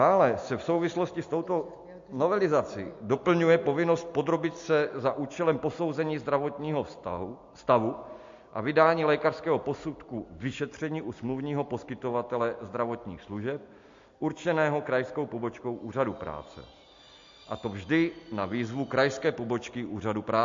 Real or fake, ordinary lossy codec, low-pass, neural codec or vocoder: real; MP3, 48 kbps; 7.2 kHz; none